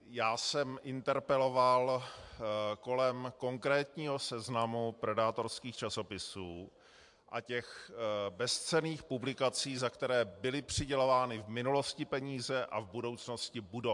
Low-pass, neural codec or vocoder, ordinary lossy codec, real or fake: 10.8 kHz; vocoder, 44.1 kHz, 128 mel bands every 512 samples, BigVGAN v2; MP3, 64 kbps; fake